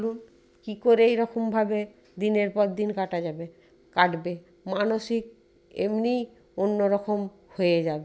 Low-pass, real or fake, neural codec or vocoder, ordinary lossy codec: none; real; none; none